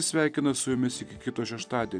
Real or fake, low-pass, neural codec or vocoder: real; 9.9 kHz; none